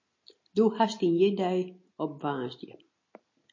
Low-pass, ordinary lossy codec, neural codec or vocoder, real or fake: 7.2 kHz; MP3, 32 kbps; none; real